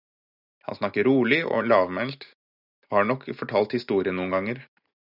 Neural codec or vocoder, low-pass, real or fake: none; 5.4 kHz; real